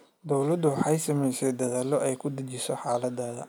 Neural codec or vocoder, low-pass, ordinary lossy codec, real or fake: none; none; none; real